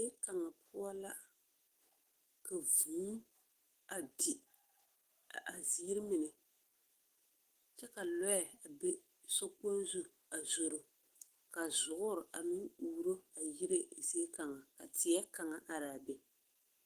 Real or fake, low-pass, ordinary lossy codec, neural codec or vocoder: fake; 14.4 kHz; Opus, 16 kbps; autoencoder, 48 kHz, 128 numbers a frame, DAC-VAE, trained on Japanese speech